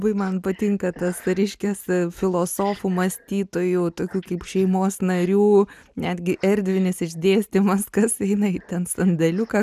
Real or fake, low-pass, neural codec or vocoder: real; 14.4 kHz; none